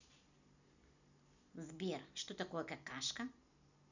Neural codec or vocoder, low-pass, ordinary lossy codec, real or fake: none; 7.2 kHz; none; real